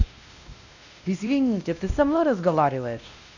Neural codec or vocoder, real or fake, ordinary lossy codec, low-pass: codec, 16 kHz, 1 kbps, X-Codec, WavLM features, trained on Multilingual LibriSpeech; fake; none; 7.2 kHz